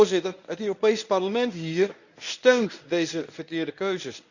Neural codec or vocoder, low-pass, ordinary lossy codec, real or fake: codec, 24 kHz, 0.9 kbps, WavTokenizer, medium speech release version 1; 7.2 kHz; none; fake